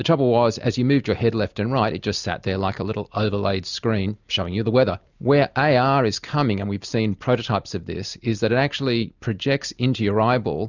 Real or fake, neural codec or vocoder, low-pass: real; none; 7.2 kHz